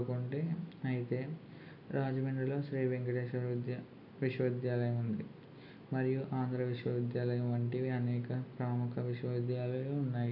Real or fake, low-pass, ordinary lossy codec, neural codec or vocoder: real; 5.4 kHz; none; none